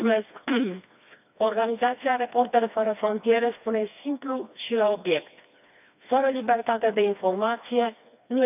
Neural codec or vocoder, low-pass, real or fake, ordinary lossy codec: codec, 16 kHz, 2 kbps, FreqCodec, smaller model; 3.6 kHz; fake; none